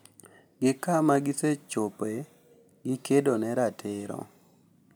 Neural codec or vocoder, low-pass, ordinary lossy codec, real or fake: none; none; none; real